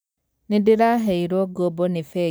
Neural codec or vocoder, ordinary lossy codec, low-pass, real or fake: none; none; none; real